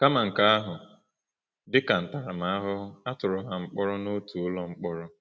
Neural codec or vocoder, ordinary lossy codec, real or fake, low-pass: none; none; real; none